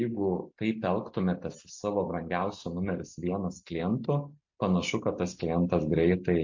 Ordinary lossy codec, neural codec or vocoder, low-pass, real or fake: MP3, 48 kbps; none; 7.2 kHz; real